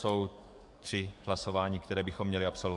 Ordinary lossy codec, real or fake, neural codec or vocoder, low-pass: MP3, 64 kbps; fake; codec, 44.1 kHz, 7.8 kbps, DAC; 10.8 kHz